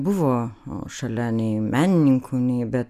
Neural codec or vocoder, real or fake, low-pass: none; real; 14.4 kHz